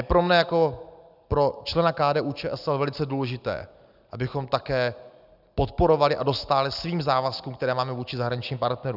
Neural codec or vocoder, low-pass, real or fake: none; 5.4 kHz; real